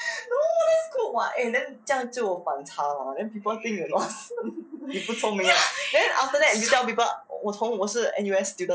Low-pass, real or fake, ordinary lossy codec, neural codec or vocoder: none; real; none; none